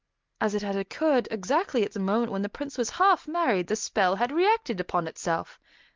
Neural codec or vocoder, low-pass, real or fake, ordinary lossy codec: none; 7.2 kHz; real; Opus, 32 kbps